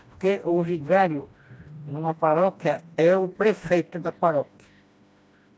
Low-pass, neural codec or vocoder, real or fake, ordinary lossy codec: none; codec, 16 kHz, 1 kbps, FreqCodec, smaller model; fake; none